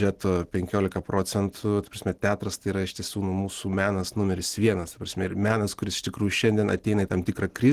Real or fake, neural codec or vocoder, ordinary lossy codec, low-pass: real; none; Opus, 16 kbps; 14.4 kHz